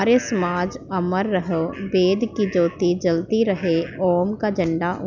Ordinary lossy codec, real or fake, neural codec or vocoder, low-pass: none; real; none; 7.2 kHz